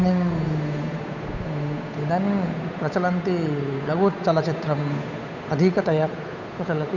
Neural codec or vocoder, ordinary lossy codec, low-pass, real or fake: codec, 16 kHz, 8 kbps, FunCodec, trained on Chinese and English, 25 frames a second; none; 7.2 kHz; fake